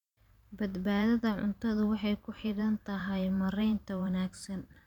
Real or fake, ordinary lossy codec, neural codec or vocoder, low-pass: fake; none; vocoder, 44.1 kHz, 128 mel bands every 512 samples, BigVGAN v2; 19.8 kHz